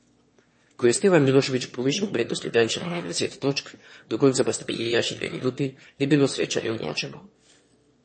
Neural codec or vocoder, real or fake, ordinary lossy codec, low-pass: autoencoder, 22.05 kHz, a latent of 192 numbers a frame, VITS, trained on one speaker; fake; MP3, 32 kbps; 9.9 kHz